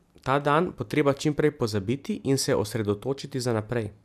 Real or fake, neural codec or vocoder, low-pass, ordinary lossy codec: real; none; 14.4 kHz; none